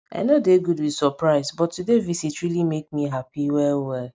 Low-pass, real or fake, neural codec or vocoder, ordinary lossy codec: none; real; none; none